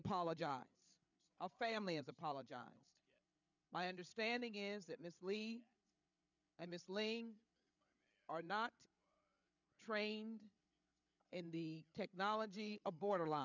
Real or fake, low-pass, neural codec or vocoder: fake; 7.2 kHz; codec, 16 kHz, 8 kbps, FreqCodec, larger model